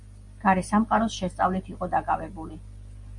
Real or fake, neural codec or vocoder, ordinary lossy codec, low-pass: real; none; MP3, 48 kbps; 10.8 kHz